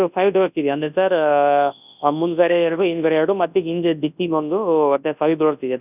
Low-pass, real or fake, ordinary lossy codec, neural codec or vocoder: 3.6 kHz; fake; none; codec, 24 kHz, 0.9 kbps, WavTokenizer, large speech release